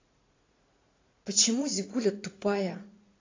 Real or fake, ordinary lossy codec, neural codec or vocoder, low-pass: fake; AAC, 32 kbps; vocoder, 44.1 kHz, 80 mel bands, Vocos; 7.2 kHz